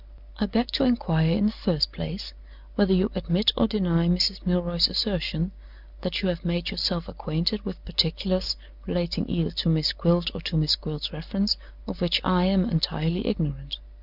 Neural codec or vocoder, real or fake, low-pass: none; real; 5.4 kHz